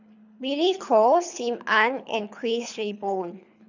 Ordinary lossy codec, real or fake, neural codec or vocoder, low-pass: none; fake; codec, 24 kHz, 3 kbps, HILCodec; 7.2 kHz